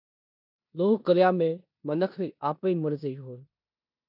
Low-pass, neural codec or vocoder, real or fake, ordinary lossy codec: 5.4 kHz; codec, 16 kHz in and 24 kHz out, 0.9 kbps, LongCat-Audio-Codec, four codebook decoder; fake; AAC, 48 kbps